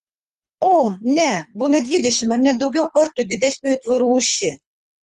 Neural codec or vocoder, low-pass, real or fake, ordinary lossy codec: codec, 24 kHz, 3 kbps, HILCodec; 10.8 kHz; fake; Opus, 32 kbps